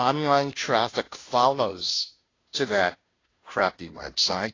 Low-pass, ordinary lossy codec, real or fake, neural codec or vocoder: 7.2 kHz; AAC, 32 kbps; fake; codec, 16 kHz, 0.5 kbps, FunCodec, trained on Chinese and English, 25 frames a second